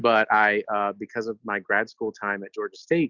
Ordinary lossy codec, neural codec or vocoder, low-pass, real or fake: Opus, 64 kbps; none; 7.2 kHz; real